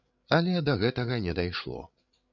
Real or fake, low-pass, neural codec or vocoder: fake; 7.2 kHz; codec, 16 kHz, 8 kbps, FreqCodec, larger model